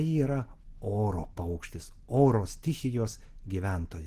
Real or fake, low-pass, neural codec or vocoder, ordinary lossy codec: real; 14.4 kHz; none; Opus, 16 kbps